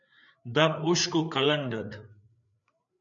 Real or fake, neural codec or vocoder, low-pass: fake; codec, 16 kHz, 4 kbps, FreqCodec, larger model; 7.2 kHz